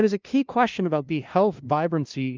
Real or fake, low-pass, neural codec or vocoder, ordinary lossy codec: fake; 7.2 kHz; codec, 16 kHz, 0.5 kbps, FunCodec, trained on LibriTTS, 25 frames a second; Opus, 24 kbps